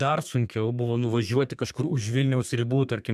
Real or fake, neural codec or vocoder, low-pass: fake; codec, 32 kHz, 1.9 kbps, SNAC; 14.4 kHz